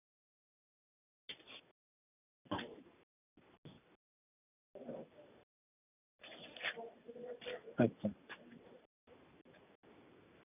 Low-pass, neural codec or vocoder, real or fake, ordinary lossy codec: 3.6 kHz; none; real; none